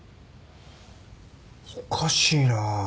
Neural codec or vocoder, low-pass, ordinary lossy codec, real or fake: none; none; none; real